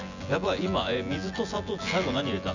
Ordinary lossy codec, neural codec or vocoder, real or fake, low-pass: none; vocoder, 24 kHz, 100 mel bands, Vocos; fake; 7.2 kHz